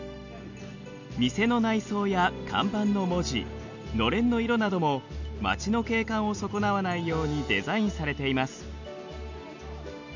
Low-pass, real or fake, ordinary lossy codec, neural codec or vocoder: 7.2 kHz; real; none; none